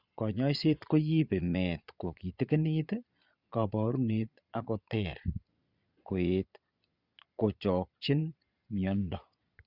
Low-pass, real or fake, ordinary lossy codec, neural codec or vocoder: 5.4 kHz; fake; Opus, 64 kbps; vocoder, 22.05 kHz, 80 mel bands, Vocos